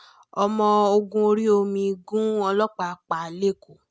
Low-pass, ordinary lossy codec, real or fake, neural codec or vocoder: none; none; real; none